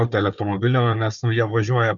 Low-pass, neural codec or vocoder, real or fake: 7.2 kHz; codec, 16 kHz, 4 kbps, FunCodec, trained on Chinese and English, 50 frames a second; fake